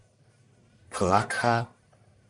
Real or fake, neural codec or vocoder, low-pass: fake; codec, 44.1 kHz, 1.7 kbps, Pupu-Codec; 10.8 kHz